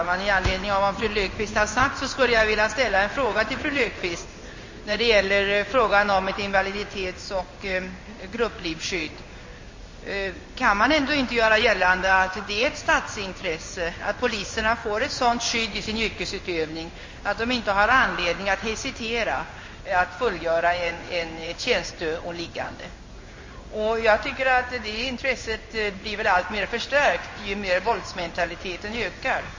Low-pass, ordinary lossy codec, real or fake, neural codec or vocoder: 7.2 kHz; MP3, 32 kbps; fake; codec, 16 kHz in and 24 kHz out, 1 kbps, XY-Tokenizer